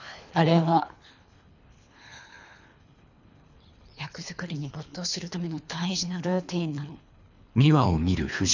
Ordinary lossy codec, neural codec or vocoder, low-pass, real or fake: none; codec, 24 kHz, 3 kbps, HILCodec; 7.2 kHz; fake